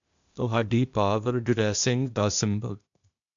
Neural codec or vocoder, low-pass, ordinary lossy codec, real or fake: codec, 16 kHz, 0.8 kbps, ZipCodec; 7.2 kHz; MP3, 64 kbps; fake